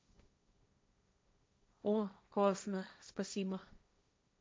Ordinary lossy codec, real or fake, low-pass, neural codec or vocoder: none; fake; none; codec, 16 kHz, 1.1 kbps, Voila-Tokenizer